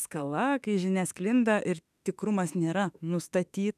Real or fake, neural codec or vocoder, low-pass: fake; autoencoder, 48 kHz, 32 numbers a frame, DAC-VAE, trained on Japanese speech; 14.4 kHz